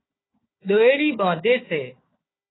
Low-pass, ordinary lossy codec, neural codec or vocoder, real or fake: 7.2 kHz; AAC, 16 kbps; codec, 16 kHz, 4 kbps, FunCodec, trained on Chinese and English, 50 frames a second; fake